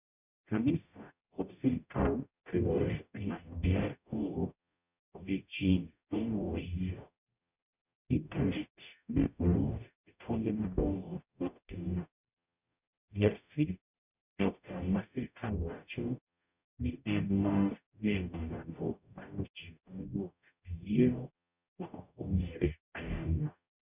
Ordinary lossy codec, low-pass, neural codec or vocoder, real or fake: none; 3.6 kHz; codec, 44.1 kHz, 0.9 kbps, DAC; fake